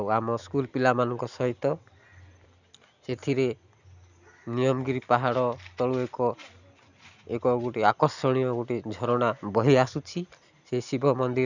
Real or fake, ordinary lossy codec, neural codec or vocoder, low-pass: real; none; none; 7.2 kHz